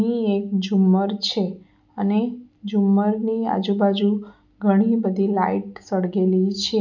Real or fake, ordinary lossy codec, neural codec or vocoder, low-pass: real; none; none; 7.2 kHz